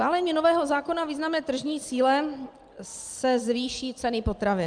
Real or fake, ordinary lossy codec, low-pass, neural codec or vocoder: real; Opus, 32 kbps; 9.9 kHz; none